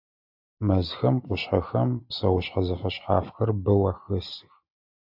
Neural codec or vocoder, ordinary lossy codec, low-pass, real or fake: none; AAC, 48 kbps; 5.4 kHz; real